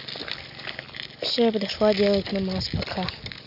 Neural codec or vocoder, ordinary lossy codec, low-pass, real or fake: none; none; 5.4 kHz; real